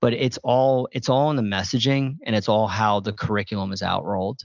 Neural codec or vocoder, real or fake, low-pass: none; real; 7.2 kHz